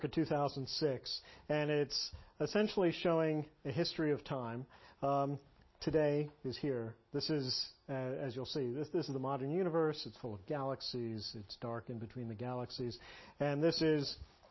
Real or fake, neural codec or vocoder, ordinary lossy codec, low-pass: real; none; MP3, 24 kbps; 7.2 kHz